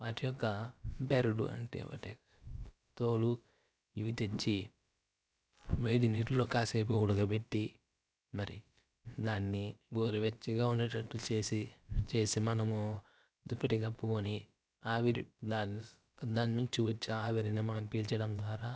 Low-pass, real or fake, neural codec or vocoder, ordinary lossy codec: none; fake; codec, 16 kHz, about 1 kbps, DyCAST, with the encoder's durations; none